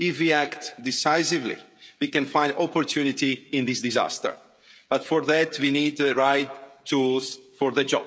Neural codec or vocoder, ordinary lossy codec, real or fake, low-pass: codec, 16 kHz, 16 kbps, FreqCodec, smaller model; none; fake; none